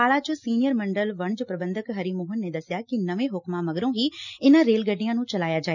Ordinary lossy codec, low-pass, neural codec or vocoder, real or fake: none; 7.2 kHz; none; real